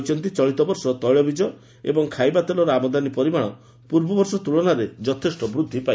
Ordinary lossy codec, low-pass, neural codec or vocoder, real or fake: none; none; none; real